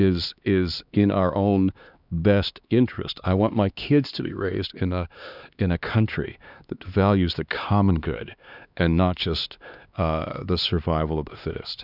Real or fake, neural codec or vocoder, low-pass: fake; codec, 16 kHz, 2 kbps, X-Codec, HuBERT features, trained on LibriSpeech; 5.4 kHz